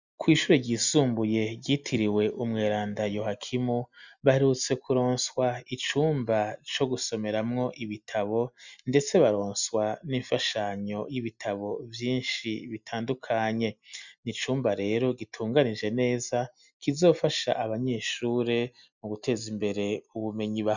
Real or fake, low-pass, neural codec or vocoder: real; 7.2 kHz; none